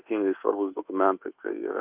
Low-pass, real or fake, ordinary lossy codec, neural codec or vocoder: 3.6 kHz; fake; Opus, 24 kbps; codec, 24 kHz, 1.2 kbps, DualCodec